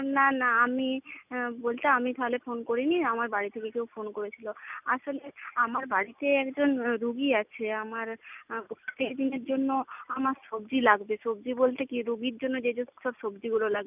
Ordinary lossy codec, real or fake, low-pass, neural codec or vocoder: none; real; 3.6 kHz; none